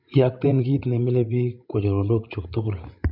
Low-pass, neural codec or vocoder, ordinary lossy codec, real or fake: 5.4 kHz; codec, 16 kHz, 16 kbps, FreqCodec, larger model; MP3, 48 kbps; fake